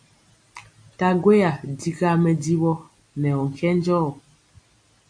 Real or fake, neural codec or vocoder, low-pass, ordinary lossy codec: real; none; 9.9 kHz; AAC, 64 kbps